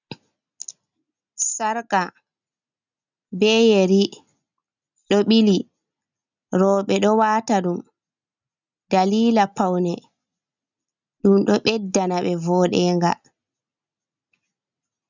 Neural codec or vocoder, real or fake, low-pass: none; real; 7.2 kHz